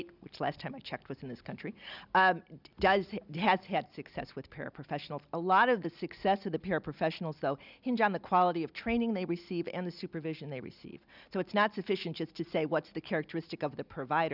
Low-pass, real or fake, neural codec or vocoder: 5.4 kHz; real; none